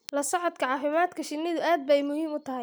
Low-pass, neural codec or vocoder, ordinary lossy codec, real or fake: none; none; none; real